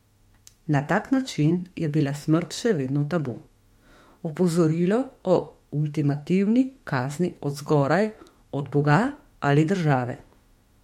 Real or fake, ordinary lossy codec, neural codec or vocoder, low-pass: fake; MP3, 64 kbps; autoencoder, 48 kHz, 32 numbers a frame, DAC-VAE, trained on Japanese speech; 19.8 kHz